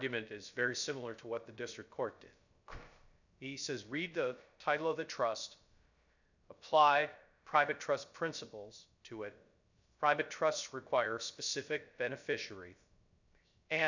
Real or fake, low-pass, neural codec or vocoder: fake; 7.2 kHz; codec, 16 kHz, 0.3 kbps, FocalCodec